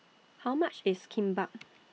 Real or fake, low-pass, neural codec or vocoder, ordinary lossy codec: real; none; none; none